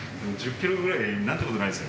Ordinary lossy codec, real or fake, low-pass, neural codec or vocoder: none; real; none; none